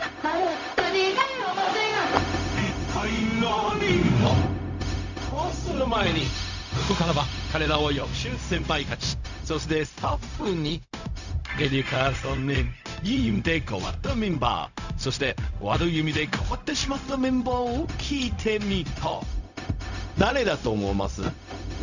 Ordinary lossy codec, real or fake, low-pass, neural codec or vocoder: none; fake; 7.2 kHz; codec, 16 kHz, 0.4 kbps, LongCat-Audio-Codec